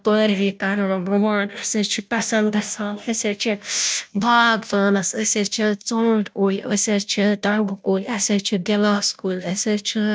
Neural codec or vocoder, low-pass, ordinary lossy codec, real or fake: codec, 16 kHz, 0.5 kbps, FunCodec, trained on Chinese and English, 25 frames a second; none; none; fake